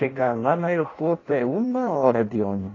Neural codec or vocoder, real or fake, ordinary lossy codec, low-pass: codec, 16 kHz in and 24 kHz out, 0.6 kbps, FireRedTTS-2 codec; fake; AAC, 32 kbps; 7.2 kHz